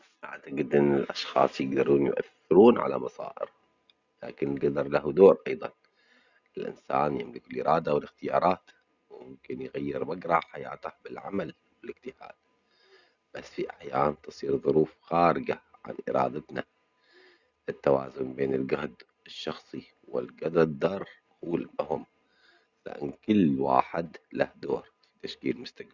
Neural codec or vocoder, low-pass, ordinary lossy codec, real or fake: none; 7.2 kHz; Opus, 64 kbps; real